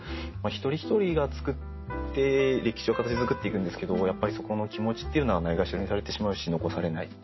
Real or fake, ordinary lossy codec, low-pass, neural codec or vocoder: real; MP3, 24 kbps; 7.2 kHz; none